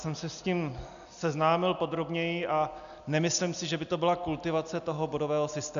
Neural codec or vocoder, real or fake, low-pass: none; real; 7.2 kHz